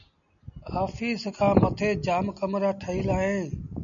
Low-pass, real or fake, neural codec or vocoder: 7.2 kHz; real; none